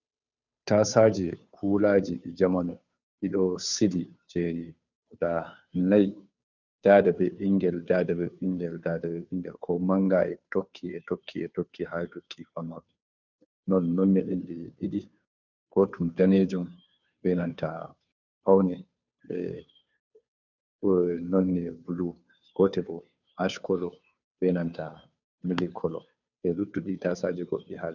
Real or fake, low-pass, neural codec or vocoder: fake; 7.2 kHz; codec, 16 kHz, 2 kbps, FunCodec, trained on Chinese and English, 25 frames a second